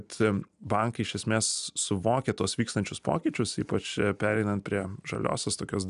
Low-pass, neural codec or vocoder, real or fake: 10.8 kHz; none; real